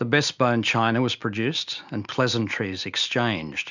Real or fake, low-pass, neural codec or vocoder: real; 7.2 kHz; none